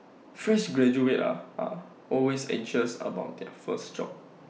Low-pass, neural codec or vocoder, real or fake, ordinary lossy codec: none; none; real; none